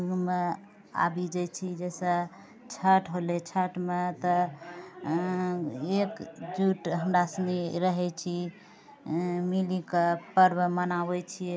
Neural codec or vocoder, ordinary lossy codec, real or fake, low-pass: none; none; real; none